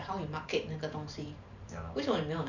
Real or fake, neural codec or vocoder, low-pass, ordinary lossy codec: fake; vocoder, 44.1 kHz, 128 mel bands every 512 samples, BigVGAN v2; 7.2 kHz; none